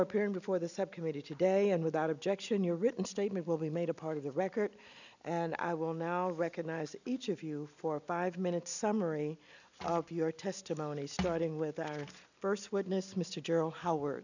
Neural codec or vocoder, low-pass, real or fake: none; 7.2 kHz; real